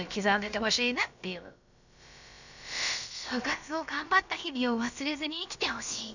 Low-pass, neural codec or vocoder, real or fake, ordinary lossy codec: 7.2 kHz; codec, 16 kHz, about 1 kbps, DyCAST, with the encoder's durations; fake; none